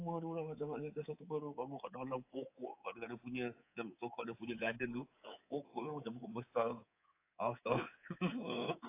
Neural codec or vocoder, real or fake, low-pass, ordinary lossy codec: codec, 24 kHz, 3.1 kbps, DualCodec; fake; 3.6 kHz; AAC, 24 kbps